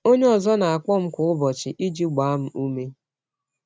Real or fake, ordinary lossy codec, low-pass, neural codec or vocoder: real; none; none; none